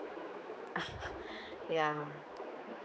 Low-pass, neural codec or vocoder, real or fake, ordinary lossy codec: none; codec, 16 kHz, 4 kbps, X-Codec, HuBERT features, trained on general audio; fake; none